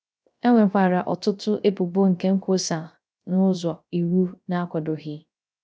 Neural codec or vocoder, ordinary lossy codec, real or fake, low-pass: codec, 16 kHz, 0.3 kbps, FocalCodec; none; fake; none